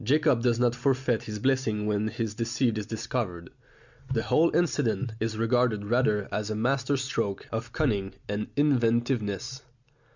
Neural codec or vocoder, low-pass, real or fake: none; 7.2 kHz; real